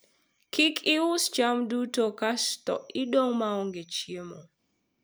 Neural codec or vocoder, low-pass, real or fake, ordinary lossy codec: none; none; real; none